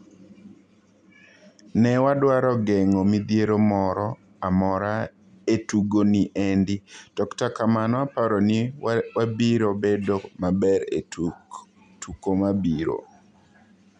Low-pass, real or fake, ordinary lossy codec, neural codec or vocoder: 9.9 kHz; real; none; none